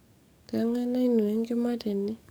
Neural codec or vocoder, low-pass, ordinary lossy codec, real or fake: codec, 44.1 kHz, 7.8 kbps, DAC; none; none; fake